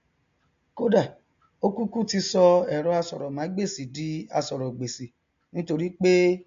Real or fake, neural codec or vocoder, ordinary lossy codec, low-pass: real; none; MP3, 48 kbps; 7.2 kHz